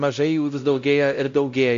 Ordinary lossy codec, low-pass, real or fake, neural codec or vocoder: MP3, 64 kbps; 7.2 kHz; fake; codec, 16 kHz, 0.5 kbps, X-Codec, WavLM features, trained on Multilingual LibriSpeech